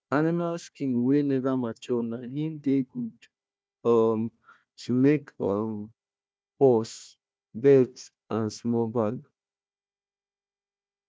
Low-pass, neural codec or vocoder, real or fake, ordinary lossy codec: none; codec, 16 kHz, 1 kbps, FunCodec, trained on Chinese and English, 50 frames a second; fake; none